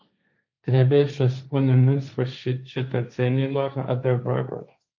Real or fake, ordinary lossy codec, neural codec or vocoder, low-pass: fake; MP3, 48 kbps; codec, 16 kHz, 1.1 kbps, Voila-Tokenizer; 7.2 kHz